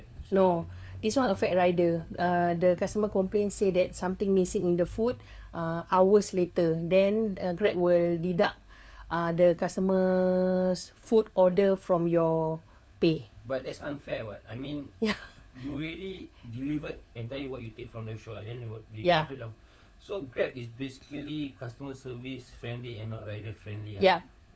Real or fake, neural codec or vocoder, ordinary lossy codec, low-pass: fake; codec, 16 kHz, 4 kbps, FunCodec, trained on LibriTTS, 50 frames a second; none; none